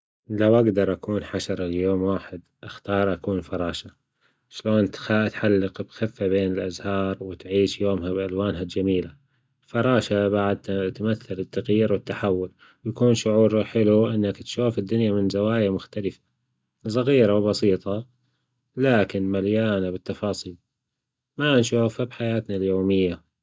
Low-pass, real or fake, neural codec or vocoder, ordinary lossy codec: none; real; none; none